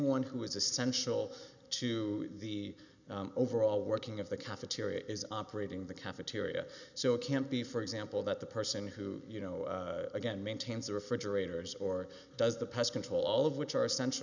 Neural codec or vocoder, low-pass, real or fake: none; 7.2 kHz; real